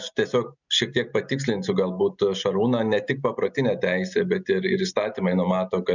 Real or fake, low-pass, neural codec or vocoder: real; 7.2 kHz; none